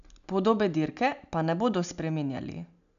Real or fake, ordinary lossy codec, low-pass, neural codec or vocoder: real; none; 7.2 kHz; none